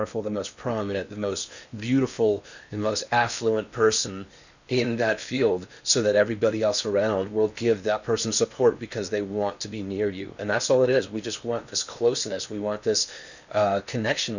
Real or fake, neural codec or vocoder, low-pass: fake; codec, 16 kHz in and 24 kHz out, 0.8 kbps, FocalCodec, streaming, 65536 codes; 7.2 kHz